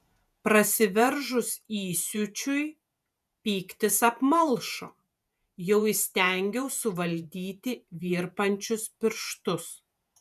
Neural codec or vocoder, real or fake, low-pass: vocoder, 48 kHz, 128 mel bands, Vocos; fake; 14.4 kHz